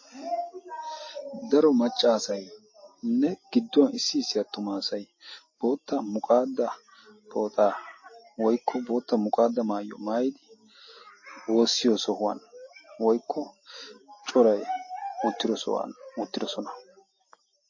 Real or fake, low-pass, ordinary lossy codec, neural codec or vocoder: real; 7.2 kHz; MP3, 32 kbps; none